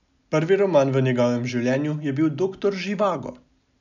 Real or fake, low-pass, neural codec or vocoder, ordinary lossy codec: real; 7.2 kHz; none; none